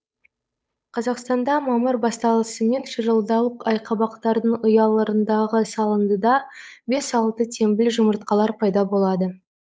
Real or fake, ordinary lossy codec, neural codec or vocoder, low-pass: fake; none; codec, 16 kHz, 8 kbps, FunCodec, trained on Chinese and English, 25 frames a second; none